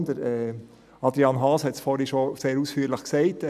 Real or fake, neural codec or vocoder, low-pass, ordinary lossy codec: real; none; 14.4 kHz; none